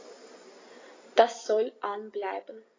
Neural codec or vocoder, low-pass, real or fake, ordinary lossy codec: none; 7.2 kHz; real; AAC, 32 kbps